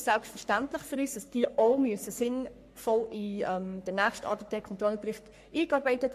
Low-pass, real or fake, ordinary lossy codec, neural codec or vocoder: 14.4 kHz; fake; MP3, 64 kbps; codec, 44.1 kHz, 3.4 kbps, Pupu-Codec